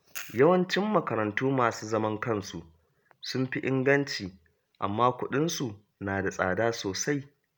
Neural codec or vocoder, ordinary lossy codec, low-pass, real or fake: none; none; none; real